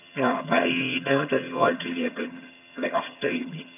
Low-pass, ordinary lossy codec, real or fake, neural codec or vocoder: 3.6 kHz; none; fake; vocoder, 22.05 kHz, 80 mel bands, HiFi-GAN